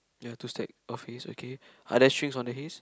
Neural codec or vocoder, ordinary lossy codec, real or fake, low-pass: none; none; real; none